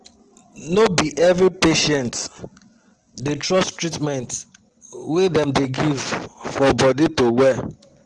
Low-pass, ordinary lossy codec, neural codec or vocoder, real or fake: 9.9 kHz; Opus, 16 kbps; none; real